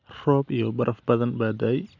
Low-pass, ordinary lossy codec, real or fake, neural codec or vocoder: 7.2 kHz; none; real; none